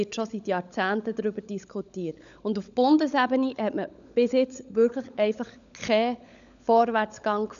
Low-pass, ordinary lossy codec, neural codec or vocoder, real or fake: 7.2 kHz; none; codec, 16 kHz, 16 kbps, FunCodec, trained on LibriTTS, 50 frames a second; fake